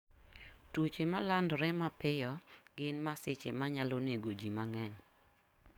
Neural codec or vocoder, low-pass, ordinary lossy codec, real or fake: codec, 44.1 kHz, 7.8 kbps, DAC; 19.8 kHz; none; fake